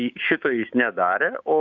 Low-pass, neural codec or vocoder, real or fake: 7.2 kHz; codec, 16 kHz, 6 kbps, DAC; fake